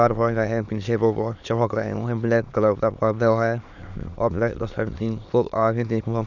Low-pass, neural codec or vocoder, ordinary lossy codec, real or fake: 7.2 kHz; autoencoder, 22.05 kHz, a latent of 192 numbers a frame, VITS, trained on many speakers; none; fake